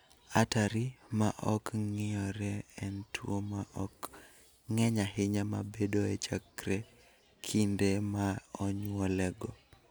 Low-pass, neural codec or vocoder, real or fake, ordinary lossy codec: none; none; real; none